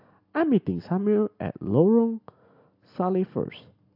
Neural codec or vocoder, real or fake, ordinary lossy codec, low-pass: none; real; AAC, 48 kbps; 5.4 kHz